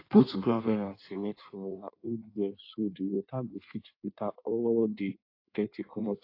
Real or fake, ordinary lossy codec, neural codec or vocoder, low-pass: fake; AAC, 32 kbps; codec, 16 kHz in and 24 kHz out, 1.1 kbps, FireRedTTS-2 codec; 5.4 kHz